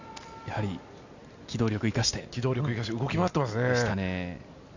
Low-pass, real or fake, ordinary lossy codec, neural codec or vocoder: 7.2 kHz; real; none; none